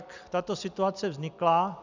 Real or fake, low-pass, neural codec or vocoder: real; 7.2 kHz; none